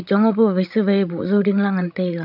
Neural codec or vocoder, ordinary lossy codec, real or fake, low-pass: codec, 16 kHz, 16 kbps, FreqCodec, larger model; none; fake; 5.4 kHz